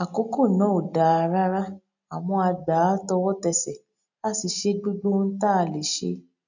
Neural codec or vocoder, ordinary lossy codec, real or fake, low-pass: none; none; real; 7.2 kHz